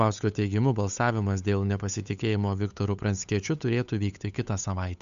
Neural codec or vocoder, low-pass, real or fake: codec, 16 kHz, 8 kbps, FunCodec, trained on Chinese and English, 25 frames a second; 7.2 kHz; fake